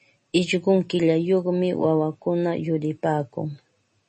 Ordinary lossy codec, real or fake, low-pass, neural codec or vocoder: MP3, 32 kbps; real; 10.8 kHz; none